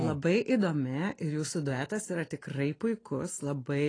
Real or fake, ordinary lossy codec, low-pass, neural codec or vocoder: real; AAC, 32 kbps; 9.9 kHz; none